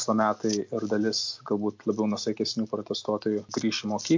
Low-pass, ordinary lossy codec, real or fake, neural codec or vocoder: 7.2 kHz; MP3, 48 kbps; real; none